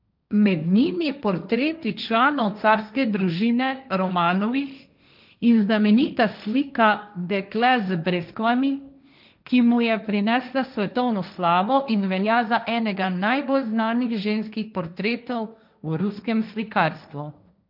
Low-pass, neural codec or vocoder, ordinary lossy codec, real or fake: 5.4 kHz; codec, 16 kHz, 1.1 kbps, Voila-Tokenizer; none; fake